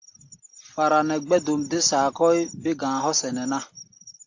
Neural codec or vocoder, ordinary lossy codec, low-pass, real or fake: none; AAC, 48 kbps; 7.2 kHz; real